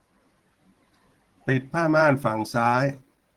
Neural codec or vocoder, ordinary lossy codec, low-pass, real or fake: vocoder, 44.1 kHz, 128 mel bands every 512 samples, BigVGAN v2; Opus, 16 kbps; 19.8 kHz; fake